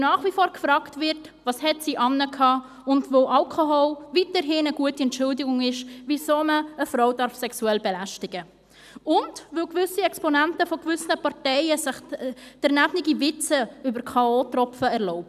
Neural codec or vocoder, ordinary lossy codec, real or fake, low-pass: none; none; real; 14.4 kHz